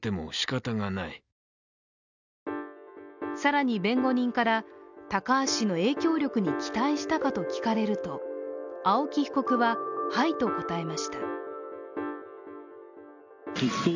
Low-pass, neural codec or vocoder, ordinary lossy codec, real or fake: 7.2 kHz; none; none; real